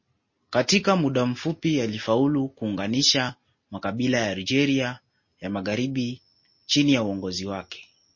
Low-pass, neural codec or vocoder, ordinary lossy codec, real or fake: 7.2 kHz; none; MP3, 32 kbps; real